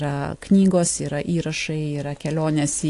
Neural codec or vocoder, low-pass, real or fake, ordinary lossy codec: none; 10.8 kHz; real; AAC, 48 kbps